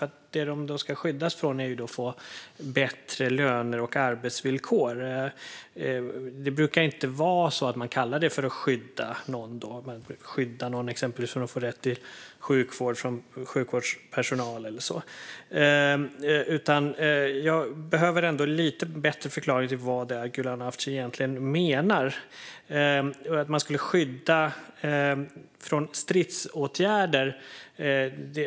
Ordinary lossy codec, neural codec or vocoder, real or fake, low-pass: none; none; real; none